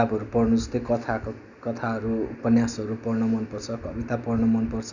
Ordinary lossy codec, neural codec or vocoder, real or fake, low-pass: none; none; real; 7.2 kHz